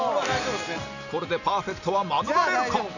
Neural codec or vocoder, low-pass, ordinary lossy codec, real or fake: none; 7.2 kHz; none; real